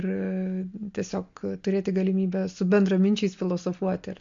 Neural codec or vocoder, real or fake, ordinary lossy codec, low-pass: none; real; MP3, 48 kbps; 7.2 kHz